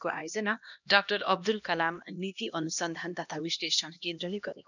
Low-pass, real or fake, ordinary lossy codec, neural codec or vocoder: 7.2 kHz; fake; none; codec, 16 kHz, 1 kbps, X-Codec, HuBERT features, trained on LibriSpeech